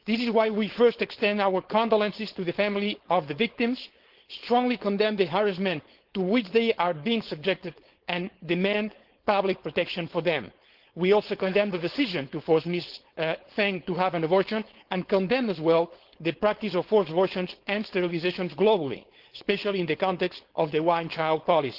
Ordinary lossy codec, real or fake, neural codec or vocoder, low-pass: Opus, 16 kbps; fake; codec, 16 kHz, 4.8 kbps, FACodec; 5.4 kHz